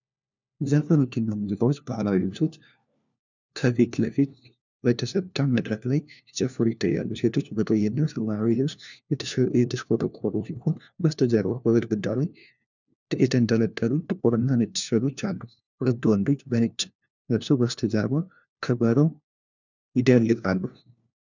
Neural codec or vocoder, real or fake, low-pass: codec, 16 kHz, 1 kbps, FunCodec, trained on LibriTTS, 50 frames a second; fake; 7.2 kHz